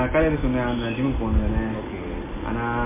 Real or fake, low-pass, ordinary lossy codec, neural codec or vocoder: real; 3.6 kHz; MP3, 24 kbps; none